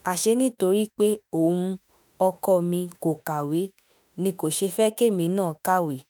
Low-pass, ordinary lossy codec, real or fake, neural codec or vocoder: none; none; fake; autoencoder, 48 kHz, 32 numbers a frame, DAC-VAE, trained on Japanese speech